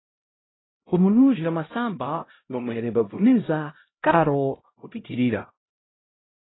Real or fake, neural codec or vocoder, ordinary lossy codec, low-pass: fake; codec, 16 kHz, 0.5 kbps, X-Codec, HuBERT features, trained on LibriSpeech; AAC, 16 kbps; 7.2 kHz